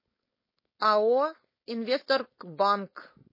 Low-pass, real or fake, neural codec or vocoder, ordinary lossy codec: 5.4 kHz; fake; codec, 16 kHz, 4.8 kbps, FACodec; MP3, 24 kbps